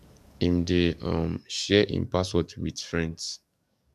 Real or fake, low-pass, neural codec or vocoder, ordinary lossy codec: fake; 14.4 kHz; codec, 44.1 kHz, 7.8 kbps, DAC; none